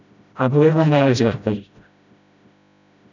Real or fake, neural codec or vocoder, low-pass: fake; codec, 16 kHz, 0.5 kbps, FreqCodec, smaller model; 7.2 kHz